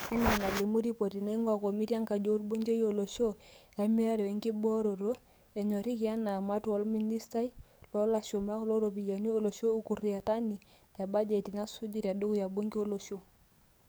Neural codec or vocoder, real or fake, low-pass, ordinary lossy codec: codec, 44.1 kHz, 7.8 kbps, DAC; fake; none; none